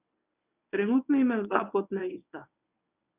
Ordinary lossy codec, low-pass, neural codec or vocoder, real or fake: none; 3.6 kHz; codec, 24 kHz, 0.9 kbps, WavTokenizer, medium speech release version 1; fake